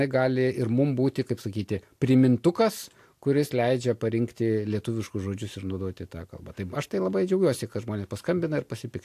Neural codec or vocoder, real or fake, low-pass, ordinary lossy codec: none; real; 14.4 kHz; AAC, 64 kbps